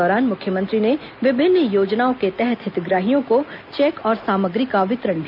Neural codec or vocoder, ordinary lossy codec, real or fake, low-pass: none; none; real; 5.4 kHz